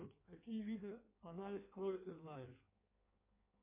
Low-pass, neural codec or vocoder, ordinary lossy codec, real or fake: 3.6 kHz; codec, 16 kHz in and 24 kHz out, 1.1 kbps, FireRedTTS-2 codec; MP3, 24 kbps; fake